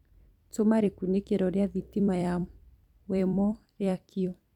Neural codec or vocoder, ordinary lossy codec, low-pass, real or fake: vocoder, 44.1 kHz, 128 mel bands every 256 samples, BigVGAN v2; none; 19.8 kHz; fake